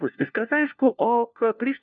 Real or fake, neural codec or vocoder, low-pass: fake; codec, 16 kHz, 1 kbps, FunCodec, trained on LibriTTS, 50 frames a second; 7.2 kHz